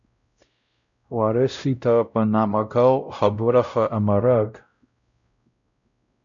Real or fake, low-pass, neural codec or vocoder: fake; 7.2 kHz; codec, 16 kHz, 0.5 kbps, X-Codec, WavLM features, trained on Multilingual LibriSpeech